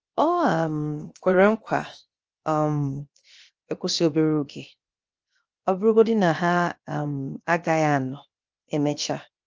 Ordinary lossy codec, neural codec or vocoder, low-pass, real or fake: Opus, 24 kbps; codec, 16 kHz, 0.7 kbps, FocalCodec; 7.2 kHz; fake